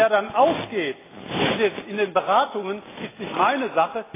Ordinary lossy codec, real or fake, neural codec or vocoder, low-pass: AAC, 16 kbps; real; none; 3.6 kHz